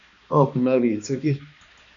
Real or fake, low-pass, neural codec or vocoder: fake; 7.2 kHz; codec, 16 kHz, 2 kbps, X-Codec, HuBERT features, trained on balanced general audio